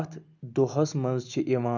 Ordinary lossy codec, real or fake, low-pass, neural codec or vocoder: none; real; 7.2 kHz; none